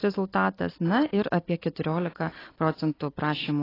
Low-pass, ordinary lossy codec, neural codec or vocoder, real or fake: 5.4 kHz; AAC, 24 kbps; none; real